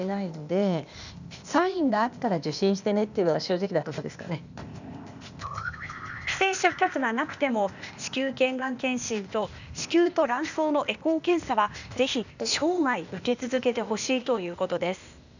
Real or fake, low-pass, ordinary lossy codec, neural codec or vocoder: fake; 7.2 kHz; none; codec, 16 kHz, 0.8 kbps, ZipCodec